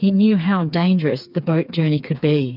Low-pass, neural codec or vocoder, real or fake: 5.4 kHz; codec, 16 kHz, 4 kbps, FreqCodec, smaller model; fake